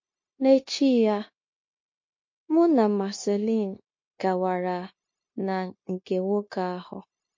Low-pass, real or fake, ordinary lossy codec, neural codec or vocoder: 7.2 kHz; fake; MP3, 32 kbps; codec, 16 kHz, 0.9 kbps, LongCat-Audio-Codec